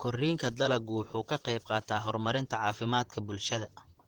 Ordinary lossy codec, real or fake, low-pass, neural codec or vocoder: Opus, 16 kbps; fake; 19.8 kHz; vocoder, 44.1 kHz, 128 mel bands, Pupu-Vocoder